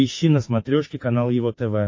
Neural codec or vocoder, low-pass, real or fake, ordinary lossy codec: codec, 16 kHz, 6 kbps, DAC; 7.2 kHz; fake; MP3, 32 kbps